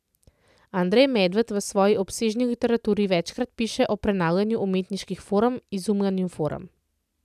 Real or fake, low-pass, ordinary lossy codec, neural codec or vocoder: real; 14.4 kHz; none; none